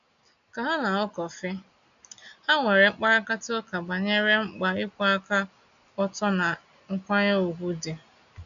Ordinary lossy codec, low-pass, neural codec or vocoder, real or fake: none; 7.2 kHz; none; real